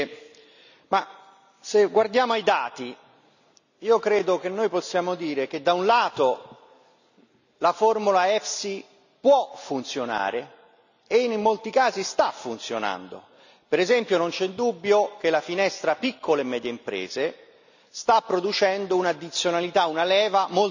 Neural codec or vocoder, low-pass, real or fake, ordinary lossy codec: none; 7.2 kHz; real; none